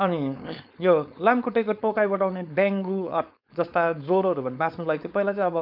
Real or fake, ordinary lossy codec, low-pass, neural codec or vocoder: fake; none; 5.4 kHz; codec, 16 kHz, 4.8 kbps, FACodec